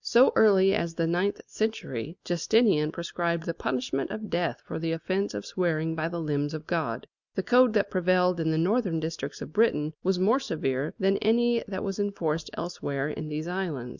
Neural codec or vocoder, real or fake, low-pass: none; real; 7.2 kHz